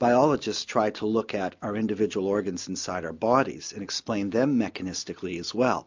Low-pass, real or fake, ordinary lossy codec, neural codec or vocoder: 7.2 kHz; real; MP3, 64 kbps; none